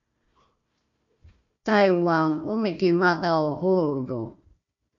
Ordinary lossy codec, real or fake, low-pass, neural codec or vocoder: AAC, 64 kbps; fake; 7.2 kHz; codec, 16 kHz, 1 kbps, FunCodec, trained on Chinese and English, 50 frames a second